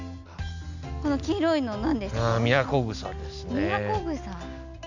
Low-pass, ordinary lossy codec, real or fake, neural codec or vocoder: 7.2 kHz; none; real; none